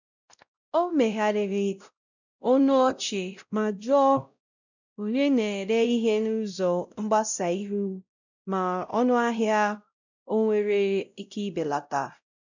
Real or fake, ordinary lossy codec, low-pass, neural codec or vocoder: fake; none; 7.2 kHz; codec, 16 kHz, 0.5 kbps, X-Codec, WavLM features, trained on Multilingual LibriSpeech